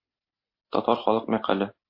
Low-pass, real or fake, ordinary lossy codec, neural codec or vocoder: 5.4 kHz; real; MP3, 32 kbps; none